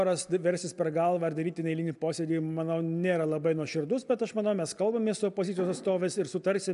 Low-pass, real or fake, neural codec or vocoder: 10.8 kHz; real; none